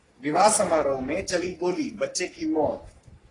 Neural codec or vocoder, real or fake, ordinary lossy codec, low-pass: codec, 44.1 kHz, 3.4 kbps, Pupu-Codec; fake; AAC, 32 kbps; 10.8 kHz